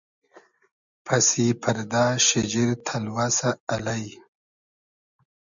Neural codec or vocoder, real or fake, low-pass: none; real; 7.2 kHz